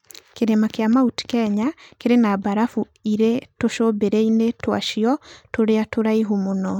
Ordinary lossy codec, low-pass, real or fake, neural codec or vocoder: none; 19.8 kHz; real; none